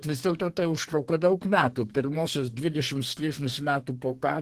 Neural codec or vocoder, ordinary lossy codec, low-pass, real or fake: codec, 32 kHz, 1.9 kbps, SNAC; Opus, 16 kbps; 14.4 kHz; fake